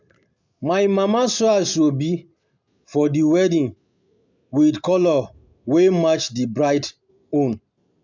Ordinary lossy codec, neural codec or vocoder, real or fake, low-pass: MP3, 64 kbps; none; real; 7.2 kHz